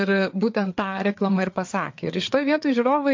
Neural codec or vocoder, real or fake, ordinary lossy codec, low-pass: codec, 16 kHz, 4 kbps, FreqCodec, larger model; fake; MP3, 48 kbps; 7.2 kHz